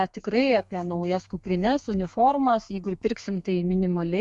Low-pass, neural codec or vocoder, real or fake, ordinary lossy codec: 10.8 kHz; codec, 32 kHz, 1.9 kbps, SNAC; fake; Opus, 64 kbps